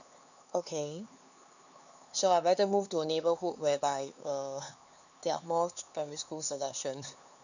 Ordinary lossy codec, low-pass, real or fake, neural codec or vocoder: none; 7.2 kHz; fake; codec, 16 kHz, 4 kbps, X-Codec, HuBERT features, trained on LibriSpeech